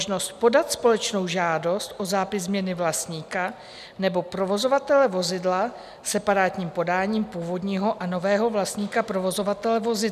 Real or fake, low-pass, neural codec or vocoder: real; 14.4 kHz; none